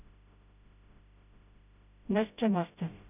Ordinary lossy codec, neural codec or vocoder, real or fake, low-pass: none; codec, 16 kHz, 0.5 kbps, FreqCodec, smaller model; fake; 3.6 kHz